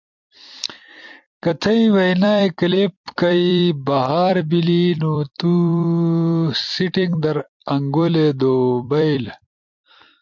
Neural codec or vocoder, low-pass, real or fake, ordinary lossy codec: vocoder, 44.1 kHz, 128 mel bands every 256 samples, BigVGAN v2; 7.2 kHz; fake; MP3, 64 kbps